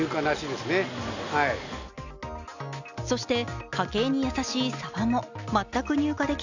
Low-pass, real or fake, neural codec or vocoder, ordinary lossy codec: 7.2 kHz; real; none; none